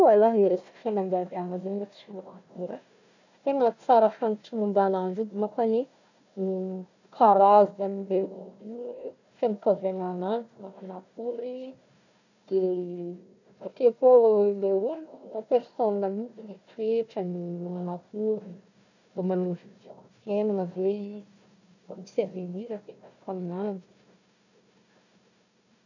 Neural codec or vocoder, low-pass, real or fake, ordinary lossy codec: codec, 16 kHz, 1 kbps, FunCodec, trained on Chinese and English, 50 frames a second; 7.2 kHz; fake; none